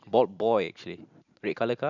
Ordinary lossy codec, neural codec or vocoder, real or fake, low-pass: none; vocoder, 44.1 kHz, 128 mel bands every 512 samples, BigVGAN v2; fake; 7.2 kHz